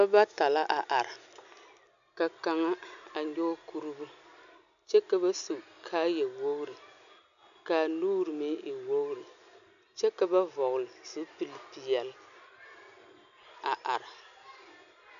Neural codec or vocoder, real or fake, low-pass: none; real; 7.2 kHz